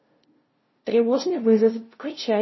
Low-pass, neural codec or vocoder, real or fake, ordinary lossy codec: 7.2 kHz; codec, 16 kHz, 0.5 kbps, FunCodec, trained on LibriTTS, 25 frames a second; fake; MP3, 24 kbps